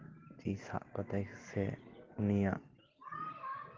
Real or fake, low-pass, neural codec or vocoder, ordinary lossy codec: real; 7.2 kHz; none; Opus, 16 kbps